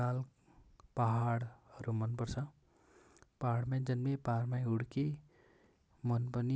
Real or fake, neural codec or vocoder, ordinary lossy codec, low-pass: real; none; none; none